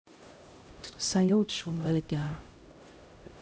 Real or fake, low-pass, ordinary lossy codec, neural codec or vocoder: fake; none; none; codec, 16 kHz, 0.5 kbps, X-Codec, HuBERT features, trained on LibriSpeech